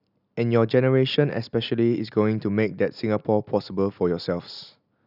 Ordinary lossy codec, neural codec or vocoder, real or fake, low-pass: none; none; real; 5.4 kHz